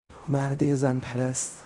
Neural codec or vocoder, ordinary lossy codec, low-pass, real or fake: codec, 16 kHz in and 24 kHz out, 0.4 kbps, LongCat-Audio-Codec, fine tuned four codebook decoder; Opus, 64 kbps; 10.8 kHz; fake